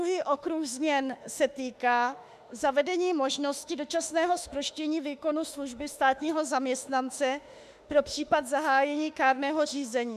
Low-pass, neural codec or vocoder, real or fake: 14.4 kHz; autoencoder, 48 kHz, 32 numbers a frame, DAC-VAE, trained on Japanese speech; fake